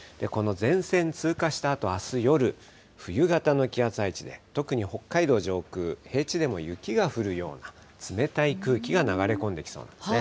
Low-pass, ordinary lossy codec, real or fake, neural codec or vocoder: none; none; real; none